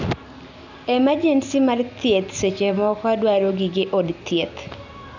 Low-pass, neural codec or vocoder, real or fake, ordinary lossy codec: 7.2 kHz; none; real; none